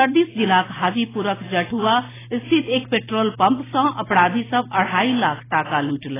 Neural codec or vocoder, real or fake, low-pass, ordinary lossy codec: none; real; 3.6 kHz; AAC, 16 kbps